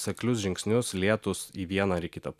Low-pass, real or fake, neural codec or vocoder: 14.4 kHz; real; none